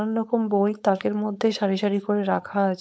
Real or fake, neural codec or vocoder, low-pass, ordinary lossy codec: fake; codec, 16 kHz, 4.8 kbps, FACodec; none; none